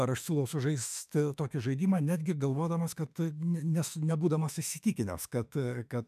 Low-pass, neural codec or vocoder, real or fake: 14.4 kHz; autoencoder, 48 kHz, 32 numbers a frame, DAC-VAE, trained on Japanese speech; fake